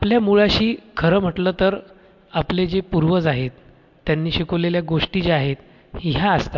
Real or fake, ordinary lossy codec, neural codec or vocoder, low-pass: real; MP3, 64 kbps; none; 7.2 kHz